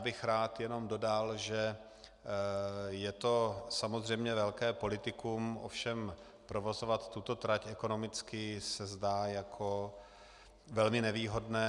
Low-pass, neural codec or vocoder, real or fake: 10.8 kHz; none; real